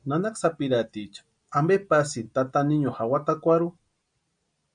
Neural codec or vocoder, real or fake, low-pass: none; real; 9.9 kHz